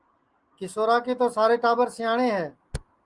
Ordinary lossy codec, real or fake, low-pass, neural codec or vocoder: Opus, 24 kbps; real; 10.8 kHz; none